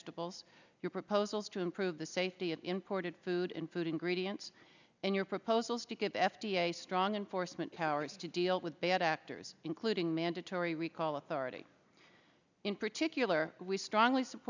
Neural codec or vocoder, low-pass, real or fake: none; 7.2 kHz; real